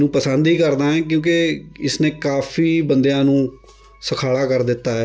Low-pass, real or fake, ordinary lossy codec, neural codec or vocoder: none; real; none; none